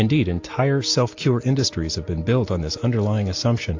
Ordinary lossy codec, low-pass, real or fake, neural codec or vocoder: AAC, 48 kbps; 7.2 kHz; real; none